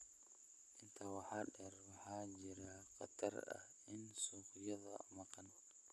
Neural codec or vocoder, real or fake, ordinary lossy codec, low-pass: none; real; none; none